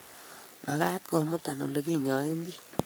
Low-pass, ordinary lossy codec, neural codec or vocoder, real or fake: none; none; codec, 44.1 kHz, 3.4 kbps, Pupu-Codec; fake